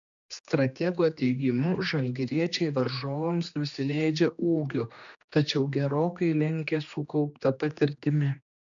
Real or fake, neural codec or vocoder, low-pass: fake; codec, 16 kHz, 2 kbps, X-Codec, HuBERT features, trained on general audio; 7.2 kHz